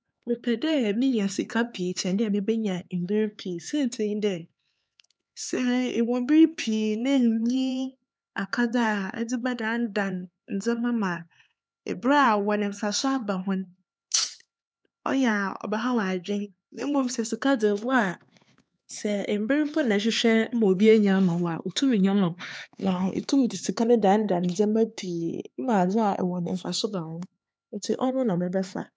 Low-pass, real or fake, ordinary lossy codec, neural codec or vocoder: none; fake; none; codec, 16 kHz, 4 kbps, X-Codec, HuBERT features, trained on LibriSpeech